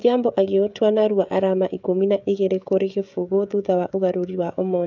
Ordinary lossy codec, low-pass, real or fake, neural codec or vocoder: none; 7.2 kHz; fake; codec, 16 kHz, 16 kbps, FreqCodec, smaller model